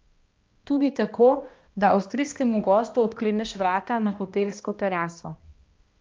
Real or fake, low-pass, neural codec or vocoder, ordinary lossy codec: fake; 7.2 kHz; codec, 16 kHz, 1 kbps, X-Codec, HuBERT features, trained on balanced general audio; Opus, 24 kbps